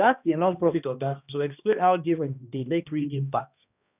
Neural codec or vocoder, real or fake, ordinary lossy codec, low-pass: codec, 16 kHz, 1 kbps, X-Codec, HuBERT features, trained on balanced general audio; fake; none; 3.6 kHz